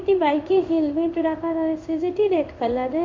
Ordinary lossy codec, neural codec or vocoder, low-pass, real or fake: MP3, 48 kbps; codec, 16 kHz in and 24 kHz out, 1 kbps, XY-Tokenizer; 7.2 kHz; fake